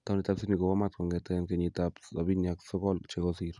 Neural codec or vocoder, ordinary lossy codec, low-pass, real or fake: none; none; 10.8 kHz; real